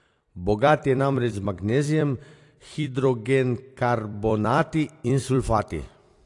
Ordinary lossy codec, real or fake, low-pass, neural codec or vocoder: AAC, 48 kbps; fake; 10.8 kHz; vocoder, 44.1 kHz, 128 mel bands every 256 samples, BigVGAN v2